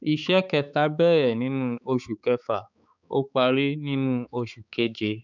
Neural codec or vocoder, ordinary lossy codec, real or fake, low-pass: codec, 16 kHz, 4 kbps, X-Codec, HuBERT features, trained on balanced general audio; none; fake; 7.2 kHz